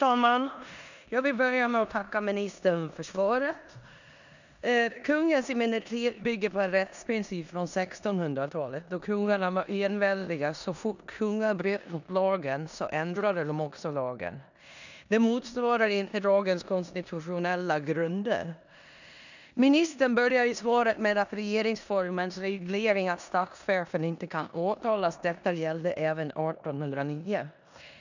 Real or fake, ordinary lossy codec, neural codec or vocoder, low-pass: fake; none; codec, 16 kHz in and 24 kHz out, 0.9 kbps, LongCat-Audio-Codec, four codebook decoder; 7.2 kHz